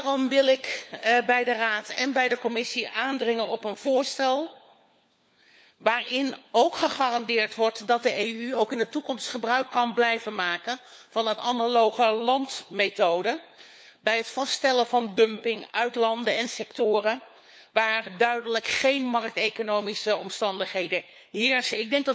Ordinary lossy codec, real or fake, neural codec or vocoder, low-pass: none; fake; codec, 16 kHz, 4 kbps, FunCodec, trained on LibriTTS, 50 frames a second; none